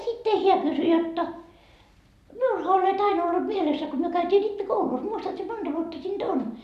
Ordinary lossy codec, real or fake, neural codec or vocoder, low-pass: none; fake; vocoder, 44.1 kHz, 128 mel bands every 256 samples, BigVGAN v2; 14.4 kHz